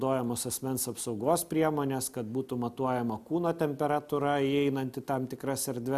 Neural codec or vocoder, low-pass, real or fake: none; 14.4 kHz; real